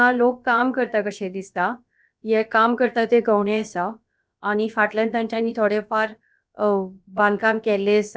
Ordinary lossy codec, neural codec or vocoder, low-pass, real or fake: none; codec, 16 kHz, about 1 kbps, DyCAST, with the encoder's durations; none; fake